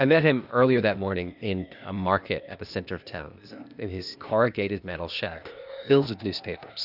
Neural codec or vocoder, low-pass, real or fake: codec, 16 kHz, 0.8 kbps, ZipCodec; 5.4 kHz; fake